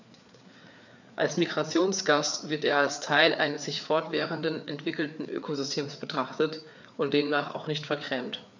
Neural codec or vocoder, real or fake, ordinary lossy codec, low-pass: codec, 16 kHz, 4 kbps, FreqCodec, larger model; fake; none; 7.2 kHz